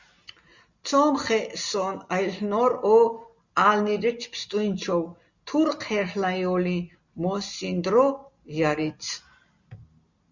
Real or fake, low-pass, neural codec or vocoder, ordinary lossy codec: real; 7.2 kHz; none; Opus, 64 kbps